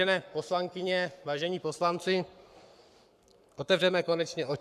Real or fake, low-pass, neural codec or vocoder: fake; 14.4 kHz; codec, 44.1 kHz, 7.8 kbps, Pupu-Codec